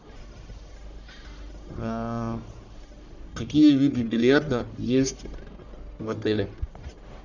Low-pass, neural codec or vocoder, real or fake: 7.2 kHz; codec, 44.1 kHz, 1.7 kbps, Pupu-Codec; fake